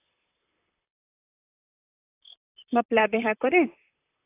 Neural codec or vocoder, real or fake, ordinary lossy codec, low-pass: vocoder, 44.1 kHz, 128 mel bands, Pupu-Vocoder; fake; AAC, 24 kbps; 3.6 kHz